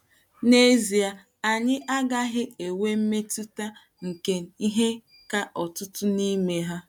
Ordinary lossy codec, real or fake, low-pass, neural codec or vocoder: none; real; 19.8 kHz; none